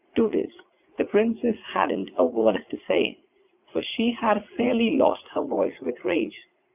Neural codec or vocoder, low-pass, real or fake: vocoder, 44.1 kHz, 80 mel bands, Vocos; 3.6 kHz; fake